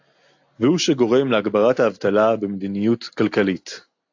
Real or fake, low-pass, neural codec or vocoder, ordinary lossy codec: real; 7.2 kHz; none; AAC, 48 kbps